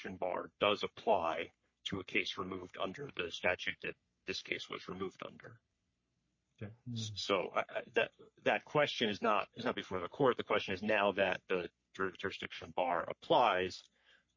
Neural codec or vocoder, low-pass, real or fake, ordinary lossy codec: codec, 44.1 kHz, 3.4 kbps, Pupu-Codec; 7.2 kHz; fake; MP3, 32 kbps